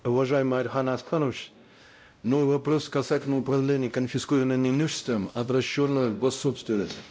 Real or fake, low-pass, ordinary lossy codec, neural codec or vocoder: fake; none; none; codec, 16 kHz, 0.5 kbps, X-Codec, WavLM features, trained on Multilingual LibriSpeech